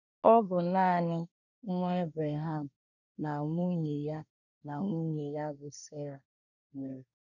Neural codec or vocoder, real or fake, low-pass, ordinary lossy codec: codec, 24 kHz, 1 kbps, SNAC; fake; 7.2 kHz; none